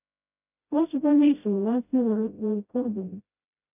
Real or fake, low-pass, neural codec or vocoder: fake; 3.6 kHz; codec, 16 kHz, 0.5 kbps, FreqCodec, smaller model